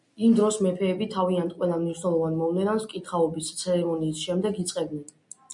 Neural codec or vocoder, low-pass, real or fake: none; 10.8 kHz; real